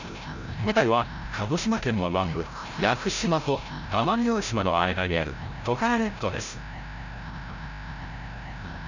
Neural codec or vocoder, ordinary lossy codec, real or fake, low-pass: codec, 16 kHz, 0.5 kbps, FreqCodec, larger model; none; fake; 7.2 kHz